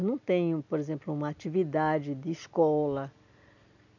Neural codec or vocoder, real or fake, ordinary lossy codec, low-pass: none; real; none; 7.2 kHz